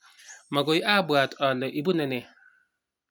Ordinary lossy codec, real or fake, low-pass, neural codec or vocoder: none; real; none; none